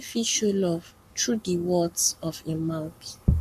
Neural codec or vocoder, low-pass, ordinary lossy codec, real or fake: codec, 44.1 kHz, 7.8 kbps, Pupu-Codec; 14.4 kHz; MP3, 96 kbps; fake